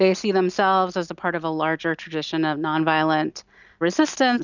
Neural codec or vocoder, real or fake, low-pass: none; real; 7.2 kHz